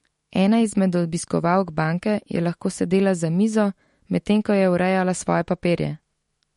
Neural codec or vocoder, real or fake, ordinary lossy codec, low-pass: autoencoder, 48 kHz, 128 numbers a frame, DAC-VAE, trained on Japanese speech; fake; MP3, 48 kbps; 19.8 kHz